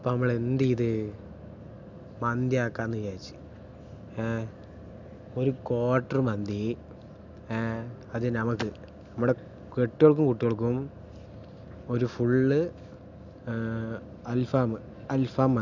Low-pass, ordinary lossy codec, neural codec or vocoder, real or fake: 7.2 kHz; none; none; real